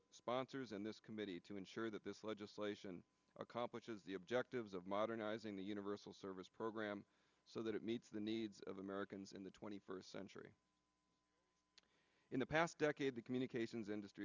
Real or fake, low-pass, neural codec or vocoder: real; 7.2 kHz; none